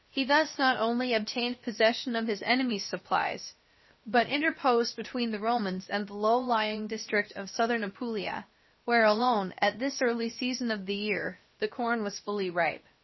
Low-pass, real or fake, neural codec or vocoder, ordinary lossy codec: 7.2 kHz; fake; codec, 16 kHz, about 1 kbps, DyCAST, with the encoder's durations; MP3, 24 kbps